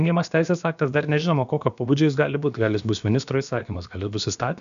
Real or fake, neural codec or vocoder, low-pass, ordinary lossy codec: fake; codec, 16 kHz, about 1 kbps, DyCAST, with the encoder's durations; 7.2 kHz; MP3, 96 kbps